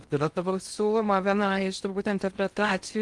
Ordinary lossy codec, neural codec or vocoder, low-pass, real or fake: Opus, 24 kbps; codec, 16 kHz in and 24 kHz out, 0.6 kbps, FocalCodec, streaming, 2048 codes; 10.8 kHz; fake